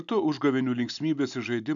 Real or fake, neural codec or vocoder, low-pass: real; none; 7.2 kHz